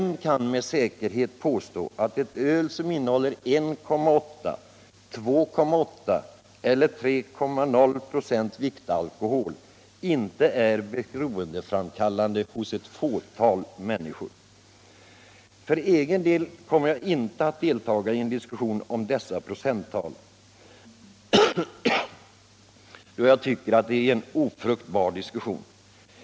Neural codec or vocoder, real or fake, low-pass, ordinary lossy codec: none; real; none; none